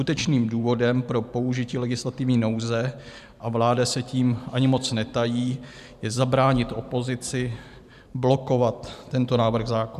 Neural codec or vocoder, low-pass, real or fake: vocoder, 44.1 kHz, 128 mel bands every 512 samples, BigVGAN v2; 14.4 kHz; fake